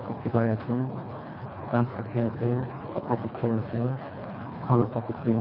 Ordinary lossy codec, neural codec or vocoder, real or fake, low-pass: none; codec, 24 kHz, 1.5 kbps, HILCodec; fake; 5.4 kHz